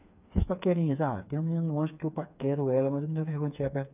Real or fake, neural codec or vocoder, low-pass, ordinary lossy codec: fake; codec, 16 kHz, 4 kbps, FreqCodec, smaller model; 3.6 kHz; none